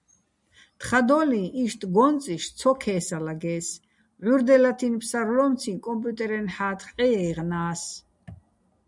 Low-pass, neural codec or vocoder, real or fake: 10.8 kHz; none; real